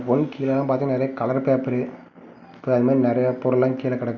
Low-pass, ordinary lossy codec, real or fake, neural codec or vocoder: 7.2 kHz; none; real; none